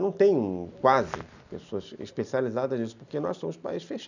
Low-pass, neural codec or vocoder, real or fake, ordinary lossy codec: 7.2 kHz; none; real; none